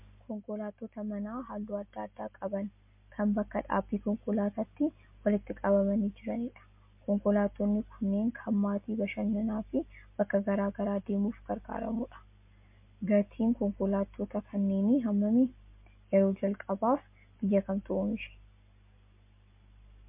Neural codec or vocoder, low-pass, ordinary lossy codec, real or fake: none; 3.6 kHz; MP3, 32 kbps; real